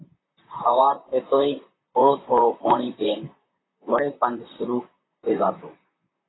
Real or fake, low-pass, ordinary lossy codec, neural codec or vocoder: fake; 7.2 kHz; AAC, 16 kbps; vocoder, 44.1 kHz, 128 mel bands, Pupu-Vocoder